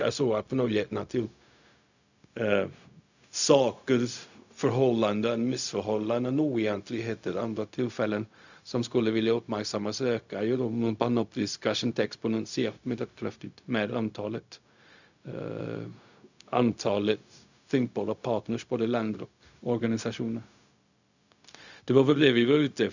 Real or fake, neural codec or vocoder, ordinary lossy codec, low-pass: fake; codec, 16 kHz, 0.4 kbps, LongCat-Audio-Codec; none; 7.2 kHz